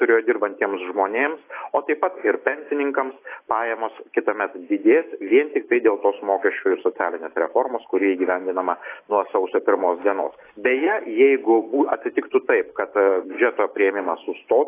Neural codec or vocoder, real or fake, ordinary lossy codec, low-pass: none; real; AAC, 24 kbps; 3.6 kHz